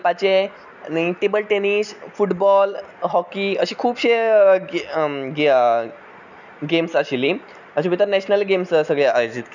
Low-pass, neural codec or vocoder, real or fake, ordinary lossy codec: 7.2 kHz; none; real; none